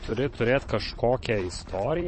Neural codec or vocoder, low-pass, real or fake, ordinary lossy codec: vocoder, 22.05 kHz, 80 mel bands, Vocos; 9.9 kHz; fake; MP3, 32 kbps